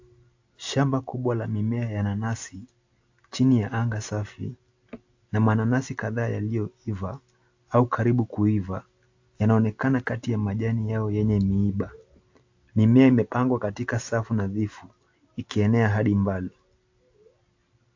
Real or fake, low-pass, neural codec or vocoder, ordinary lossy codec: real; 7.2 kHz; none; AAC, 48 kbps